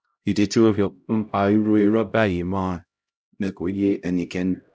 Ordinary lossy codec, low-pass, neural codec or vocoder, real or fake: none; none; codec, 16 kHz, 0.5 kbps, X-Codec, HuBERT features, trained on LibriSpeech; fake